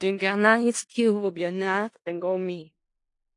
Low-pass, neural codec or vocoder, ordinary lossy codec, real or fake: 10.8 kHz; codec, 16 kHz in and 24 kHz out, 0.4 kbps, LongCat-Audio-Codec, four codebook decoder; AAC, 48 kbps; fake